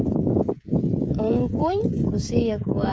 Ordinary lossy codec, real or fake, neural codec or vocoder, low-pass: none; fake; codec, 16 kHz, 16 kbps, FreqCodec, smaller model; none